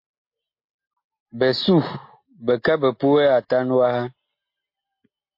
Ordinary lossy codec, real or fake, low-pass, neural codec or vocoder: MP3, 32 kbps; real; 5.4 kHz; none